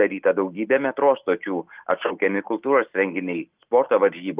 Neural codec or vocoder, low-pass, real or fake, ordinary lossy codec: none; 3.6 kHz; real; Opus, 24 kbps